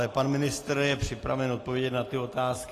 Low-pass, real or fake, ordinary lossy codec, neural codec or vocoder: 14.4 kHz; real; AAC, 48 kbps; none